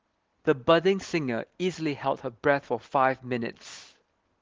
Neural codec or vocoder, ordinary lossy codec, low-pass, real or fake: none; Opus, 16 kbps; 7.2 kHz; real